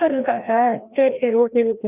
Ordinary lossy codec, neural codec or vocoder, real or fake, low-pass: none; codec, 16 kHz, 1 kbps, FreqCodec, larger model; fake; 3.6 kHz